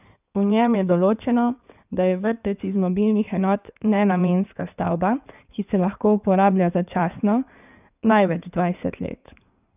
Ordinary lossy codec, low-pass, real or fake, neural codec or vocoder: none; 3.6 kHz; fake; codec, 16 kHz in and 24 kHz out, 2.2 kbps, FireRedTTS-2 codec